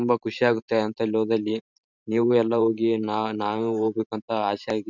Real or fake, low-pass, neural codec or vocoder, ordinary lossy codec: fake; 7.2 kHz; vocoder, 44.1 kHz, 128 mel bands every 512 samples, BigVGAN v2; none